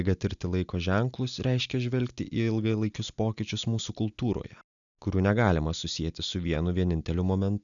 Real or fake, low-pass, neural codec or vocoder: real; 7.2 kHz; none